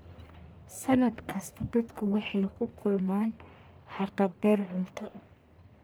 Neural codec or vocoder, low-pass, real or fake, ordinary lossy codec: codec, 44.1 kHz, 1.7 kbps, Pupu-Codec; none; fake; none